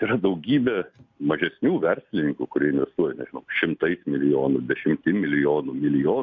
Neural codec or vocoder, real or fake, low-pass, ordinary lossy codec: none; real; 7.2 kHz; MP3, 48 kbps